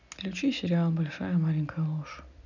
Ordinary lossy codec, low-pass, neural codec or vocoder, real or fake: none; 7.2 kHz; none; real